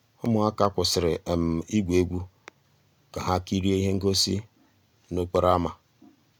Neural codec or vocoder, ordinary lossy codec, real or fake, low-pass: none; none; real; none